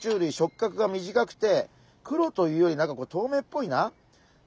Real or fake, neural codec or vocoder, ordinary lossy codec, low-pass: real; none; none; none